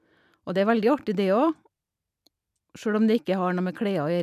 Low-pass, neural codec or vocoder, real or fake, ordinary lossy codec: 14.4 kHz; none; real; none